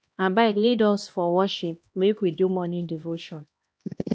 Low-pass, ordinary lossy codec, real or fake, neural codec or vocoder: none; none; fake; codec, 16 kHz, 1 kbps, X-Codec, HuBERT features, trained on LibriSpeech